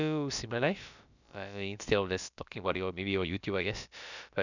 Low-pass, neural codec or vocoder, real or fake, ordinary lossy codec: 7.2 kHz; codec, 16 kHz, about 1 kbps, DyCAST, with the encoder's durations; fake; none